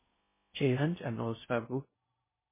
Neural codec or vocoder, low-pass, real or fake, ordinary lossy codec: codec, 16 kHz in and 24 kHz out, 0.6 kbps, FocalCodec, streaming, 4096 codes; 3.6 kHz; fake; MP3, 16 kbps